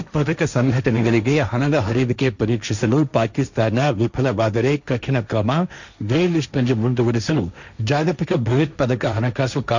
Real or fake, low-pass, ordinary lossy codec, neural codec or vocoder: fake; 7.2 kHz; none; codec, 16 kHz, 1.1 kbps, Voila-Tokenizer